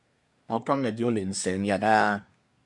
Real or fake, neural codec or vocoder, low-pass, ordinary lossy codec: fake; codec, 24 kHz, 1 kbps, SNAC; 10.8 kHz; MP3, 96 kbps